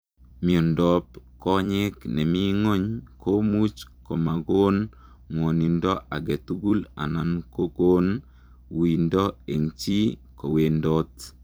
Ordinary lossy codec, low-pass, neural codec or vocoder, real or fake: none; none; vocoder, 44.1 kHz, 128 mel bands every 256 samples, BigVGAN v2; fake